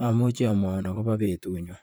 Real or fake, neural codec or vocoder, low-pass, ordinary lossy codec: fake; vocoder, 44.1 kHz, 128 mel bands, Pupu-Vocoder; none; none